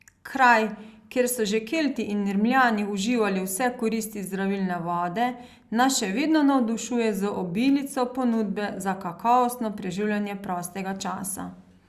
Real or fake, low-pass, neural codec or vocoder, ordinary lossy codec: real; 14.4 kHz; none; Opus, 64 kbps